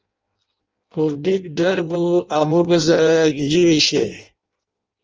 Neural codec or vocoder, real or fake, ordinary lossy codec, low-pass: codec, 16 kHz in and 24 kHz out, 0.6 kbps, FireRedTTS-2 codec; fake; Opus, 24 kbps; 7.2 kHz